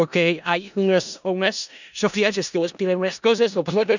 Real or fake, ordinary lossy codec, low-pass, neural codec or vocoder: fake; none; 7.2 kHz; codec, 16 kHz in and 24 kHz out, 0.4 kbps, LongCat-Audio-Codec, four codebook decoder